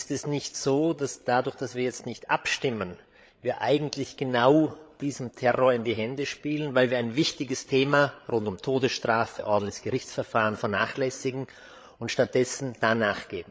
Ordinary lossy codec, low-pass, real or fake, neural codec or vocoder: none; none; fake; codec, 16 kHz, 8 kbps, FreqCodec, larger model